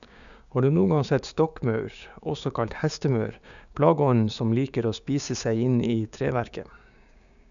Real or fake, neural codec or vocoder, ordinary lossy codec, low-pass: fake; codec, 16 kHz, 6 kbps, DAC; none; 7.2 kHz